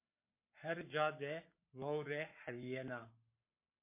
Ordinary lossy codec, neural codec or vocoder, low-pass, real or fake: MP3, 24 kbps; codec, 44.1 kHz, 7.8 kbps, Pupu-Codec; 3.6 kHz; fake